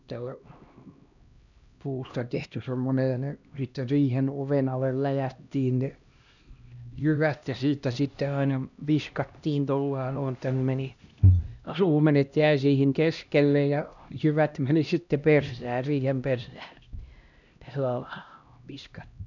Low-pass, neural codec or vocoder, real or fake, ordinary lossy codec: 7.2 kHz; codec, 16 kHz, 1 kbps, X-Codec, HuBERT features, trained on LibriSpeech; fake; none